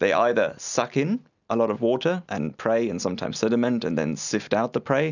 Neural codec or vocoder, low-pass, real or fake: none; 7.2 kHz; real